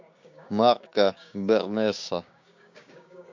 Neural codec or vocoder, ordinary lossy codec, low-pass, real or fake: codec, 16 kHz, 6 kbps, DAC; MP3, 48 kbps; 7.2 kHz; fake